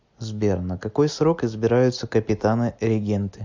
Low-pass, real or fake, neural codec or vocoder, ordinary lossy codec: 7.2 kHz; real; none; MP3, 64 kbps